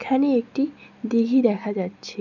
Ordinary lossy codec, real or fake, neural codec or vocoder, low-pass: none; real; none; 7.2 kHz